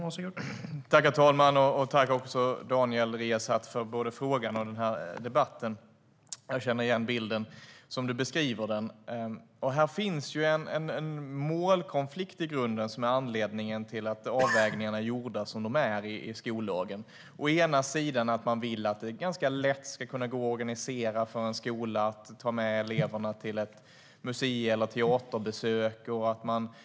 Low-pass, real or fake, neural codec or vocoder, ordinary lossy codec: none; real; none; none